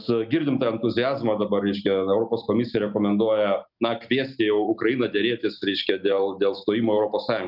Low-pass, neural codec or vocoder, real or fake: 5.4 kHz; none; real